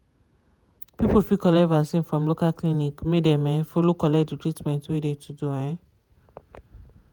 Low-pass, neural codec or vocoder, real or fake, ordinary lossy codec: none; vocoder, 48 kHz, 128 mel bands, Vocos; fake; none